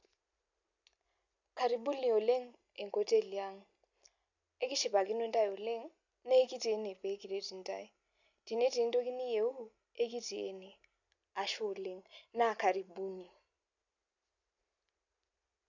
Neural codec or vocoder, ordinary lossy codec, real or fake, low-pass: none; none; real; 7.2 kHz